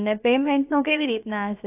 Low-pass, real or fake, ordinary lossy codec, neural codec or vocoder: 3.6 kHz; fake; AAC, 32 kbps; codec, 16 kHz, about 1 kbps, DyCAST, with the encoder's durations